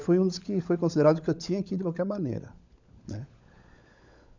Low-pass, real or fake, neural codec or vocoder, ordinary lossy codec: 7.2 kHz; fake; codec, 16 kHz, 16 kbps, FunCodec, trained on LibriTTS, 50 frames a second; none